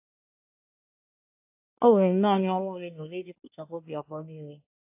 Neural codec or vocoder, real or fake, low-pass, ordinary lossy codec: codec, 24 kHz, 1 kbps, SNAC; fake; 3.6 kHz; AAC, 32 kbps